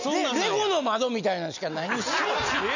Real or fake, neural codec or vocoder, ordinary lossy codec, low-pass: real; none; none; 7.2 kHz